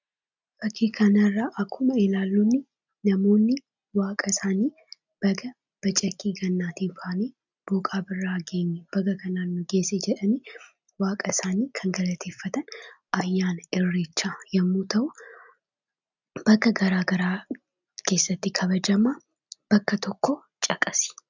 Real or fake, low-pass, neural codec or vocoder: real; 7.2 kHz; none